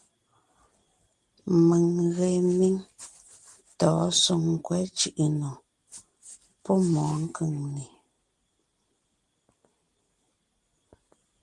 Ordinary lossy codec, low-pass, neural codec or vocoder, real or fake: Opus, 24 kbps; 10.8 kHz; none; real